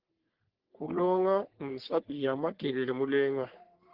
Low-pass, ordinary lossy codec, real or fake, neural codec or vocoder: 5.4 kHz; Opus, 16 kbps; fake; codec, 44.1 kHz, 3.4 kbps, Pupu-Codec